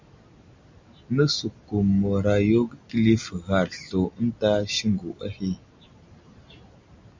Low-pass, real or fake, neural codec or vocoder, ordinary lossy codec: 7.2 kHz; real; none; MP3, 64 kbps